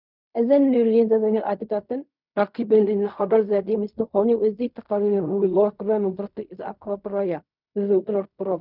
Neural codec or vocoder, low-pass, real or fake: codec, 16 kHz in and 24 kHz out, 0.4 kbps, LongCat-Audio-Codec, fine tuned four codebook decoder; 5.4 kHz; fake